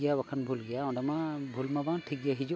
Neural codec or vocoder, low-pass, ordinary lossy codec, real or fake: none; none; none; real